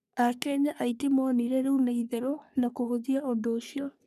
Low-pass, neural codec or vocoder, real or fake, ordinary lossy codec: 14.4 kHz; codec, 44.1 kHz, 3.4 kbps, Pupu-Codec; fake; none